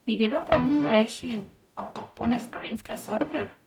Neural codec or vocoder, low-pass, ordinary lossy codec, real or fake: codec, 44.1 kHz, 0.9 kbps, DAC; 19.8 kHz; none; fake